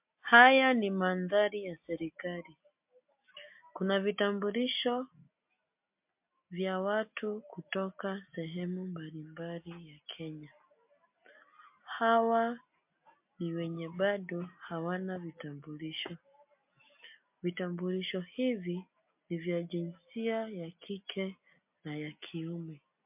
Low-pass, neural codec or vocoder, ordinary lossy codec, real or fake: 3.6 kHz; none; MP3, 32 kbps; real